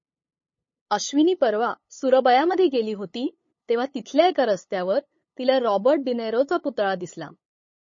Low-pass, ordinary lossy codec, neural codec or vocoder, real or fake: 7.2 kHz; MP3, 32 kbps; codec, 16 kHz, 8 kbps, FunCodec, trained on LibriTTS, 25 frames a second; fake